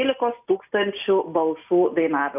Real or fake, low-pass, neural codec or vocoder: real; 3.6 kHz; none